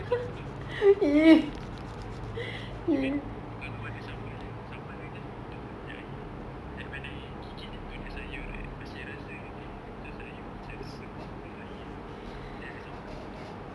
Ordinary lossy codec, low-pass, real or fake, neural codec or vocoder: none; none; real; none